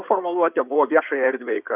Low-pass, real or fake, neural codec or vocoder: 3.6 kHz; fake; codec, 16 kHz in and 24 kHz out, 2.2 kbps, FireRedTTS-2 codec